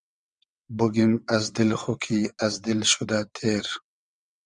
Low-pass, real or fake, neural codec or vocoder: 9.9 kHz; fake; vocoder, 22.05 kHz, 80 mel bands, WaveNeXt